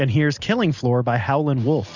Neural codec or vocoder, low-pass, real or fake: none; 7.2 kHz; real